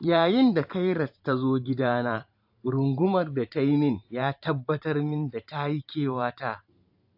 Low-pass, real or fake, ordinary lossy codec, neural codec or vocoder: 5.4 kHz; fake; AAC, 48 kbps; vocoder, 44.1 kHz, 128 mel bands every 512 samples, BigVGAN v2